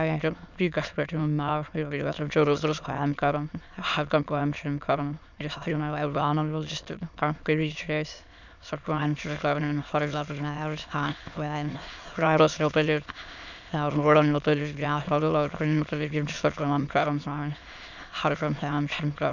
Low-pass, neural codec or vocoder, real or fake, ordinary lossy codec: 7.2 kHz; autoencoder, 22.05 kHz, a latent of 192 numbers a frame, VITS, trained on many speakers; fake; none